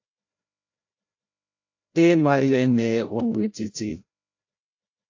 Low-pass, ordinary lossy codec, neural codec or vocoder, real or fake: 7.2 kHz; AAC, 48 kbps; codec, 16 kHz, 0.5 kbps, FreqCodec, larger model; fake